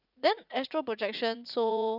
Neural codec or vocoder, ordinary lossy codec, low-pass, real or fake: vocoder, 44.1 kHz, 80 mel bands, Vocos; none; 5.4 kHz; fake